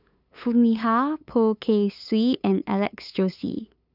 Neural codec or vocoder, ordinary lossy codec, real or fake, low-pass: codec, 16 kHz, 8 kbps, FunCodec, trained on LibriTTS, 25 frames a second; none; fake; 5.4 kHz